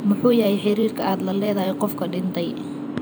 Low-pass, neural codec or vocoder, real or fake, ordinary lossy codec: none; vocoder, 44.1 kHz, 128 mel bands every 512 samples, BigVGAN v2; fake; none